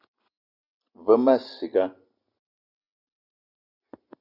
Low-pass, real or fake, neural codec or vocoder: 5.4 kHz; real; none